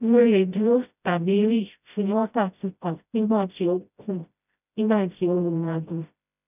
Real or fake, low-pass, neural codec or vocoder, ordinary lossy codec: fake; 3.6 kHz; codec, 16 kHz, 0.5 kbps, FreqCodec, smaller model; none